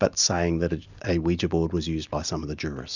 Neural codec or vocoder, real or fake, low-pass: none; real; 7.2 kHz